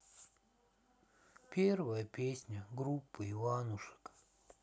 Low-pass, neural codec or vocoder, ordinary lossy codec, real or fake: none; none; none; real